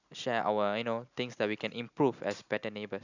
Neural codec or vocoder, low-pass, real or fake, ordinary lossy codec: none; 7.2 kHz; real; none